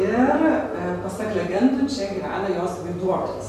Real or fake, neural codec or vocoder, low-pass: real; none; 14.4 kHz